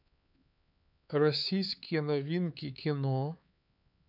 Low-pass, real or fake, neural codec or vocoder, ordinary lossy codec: 5.4 kHz; fake; codec, 16 kHz, 4 kbps, X-Codec, HuBERT features, trained on LibriSpeech; none